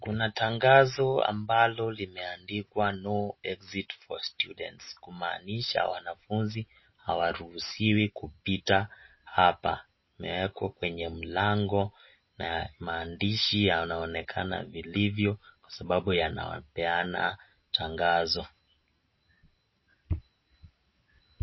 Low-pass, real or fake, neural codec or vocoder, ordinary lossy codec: 7.2 kHz; real; none; MP3, 24 kbps